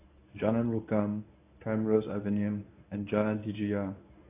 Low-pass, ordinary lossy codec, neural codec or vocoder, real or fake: 3.6 kHz; AAC, 24 kbps; codec, 24 kHz, 0.9 kbps, WavTokenizer, medium speech release version 1; fake